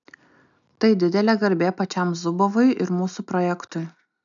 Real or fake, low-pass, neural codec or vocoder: real; 7.2 kHz; none